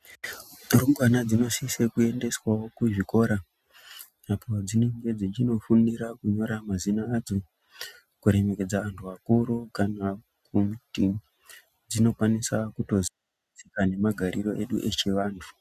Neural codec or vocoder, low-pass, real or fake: none; 14.4 kHz; real